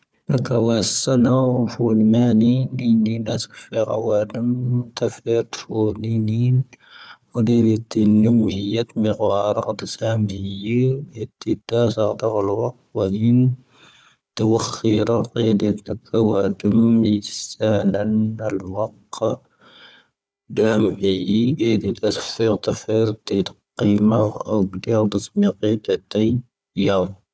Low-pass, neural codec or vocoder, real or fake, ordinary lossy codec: none; codec, 16 kHz, 4 kbps, FunCodec, trained on Chinese and English, 50 frames a second; fake; none